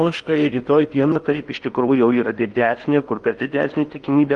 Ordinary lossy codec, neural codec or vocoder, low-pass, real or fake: Opus, 32 kbps; codec, 16 kHz in and 24 kHz out, 0.8 kbps, FocalCodec, streaming, 65536 codes; 10.8 kHz; fake